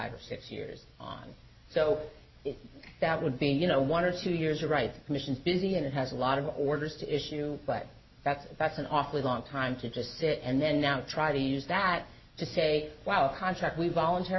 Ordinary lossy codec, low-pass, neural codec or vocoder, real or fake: MP3, 24 kbps; 7.2 kHz; none; real